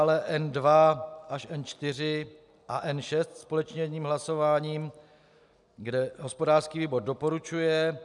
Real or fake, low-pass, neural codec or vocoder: real; 10.8 kHz; none